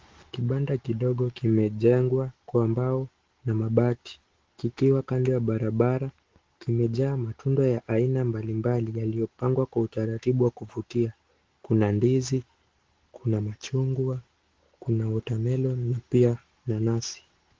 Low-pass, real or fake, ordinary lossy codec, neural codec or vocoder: 7.2 kHz; real; Opus, 16 kbps; none